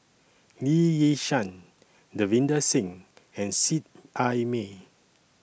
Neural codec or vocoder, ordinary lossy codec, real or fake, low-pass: none; none; real; none